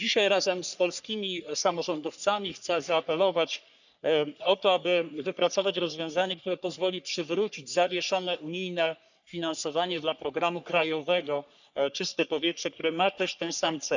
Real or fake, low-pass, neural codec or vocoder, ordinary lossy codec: fake; 7.2 kHz; codec, 44.1 kHz, 3.4 kbps, Pupu-Codec; none